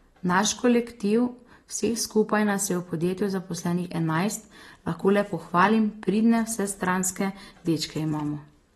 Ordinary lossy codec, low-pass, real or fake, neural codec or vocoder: AAC, 32 kbps; 19.8 kHz; real; none